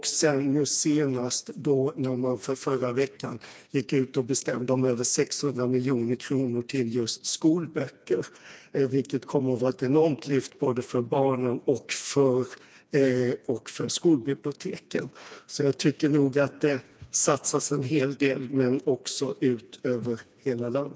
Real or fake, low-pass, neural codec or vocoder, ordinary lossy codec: fake; none; codec, 16 kHz, 2 kbps, FreqCodec, smaller model; none